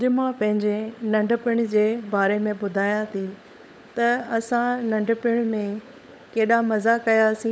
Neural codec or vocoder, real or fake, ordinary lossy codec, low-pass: codec, 16 kHz, 16 kbps, FunCodec, trained on LibriTTS, 50 frames a second; fake; none; none